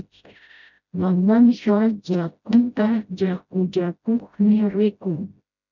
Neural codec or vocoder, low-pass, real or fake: codec, 16 kHz, 0.5 kbps, FreqCodec, smaller model; 7.2 kHz; fake